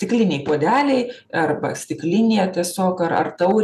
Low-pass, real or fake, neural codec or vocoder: 14.4 kHz; real; none